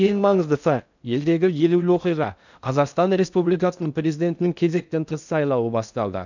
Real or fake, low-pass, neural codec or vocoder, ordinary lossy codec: fake; 7.2 kHz; codec, 16 kHz in and 24 kHz out, 0.6 kbps, FocalCodec, streaming, 2048 codes; none